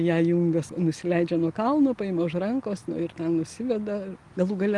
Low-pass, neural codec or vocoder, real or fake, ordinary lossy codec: 10.8 kHz; none; real; Opus, 24 kbps